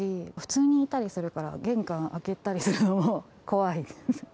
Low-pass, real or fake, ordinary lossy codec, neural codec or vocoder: none; real; none; none